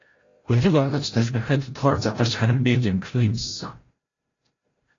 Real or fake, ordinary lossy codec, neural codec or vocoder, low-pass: fake; AAC, 32 kbps; codec, 16 kHz, 0.5 kbps, FreqCodec, larger model; 7.2 kHz